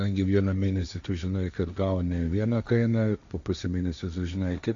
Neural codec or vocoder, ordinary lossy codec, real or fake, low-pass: codec, 16 kHz, 1.1 kbps, Voila-Tokenizer; AAC, 64 kbps; fake; 7.2 kHz